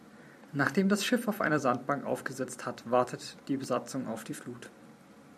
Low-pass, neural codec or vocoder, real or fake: 14.4 kHz; vocoder, 44.1 kHz, 128 mel bands every 256 samples, BigVGAN v2; fake